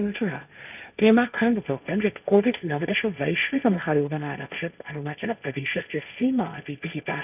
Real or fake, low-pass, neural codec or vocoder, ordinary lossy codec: fake; 3.6 kHz; codec, 16 kHz, 1.1 kbps, Voila-Tokenizer; none